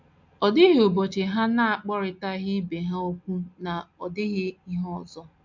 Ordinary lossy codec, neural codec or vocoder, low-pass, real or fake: MP3, 64 kbps; none; 7.2 kHz; real